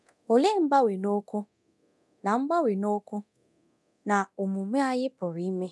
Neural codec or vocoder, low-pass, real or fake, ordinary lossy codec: codec, 24 kHz, 0.9 kbps, DualCodec; none; fake; none